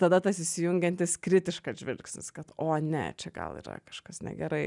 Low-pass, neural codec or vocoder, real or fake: 10.8 kHz; none; real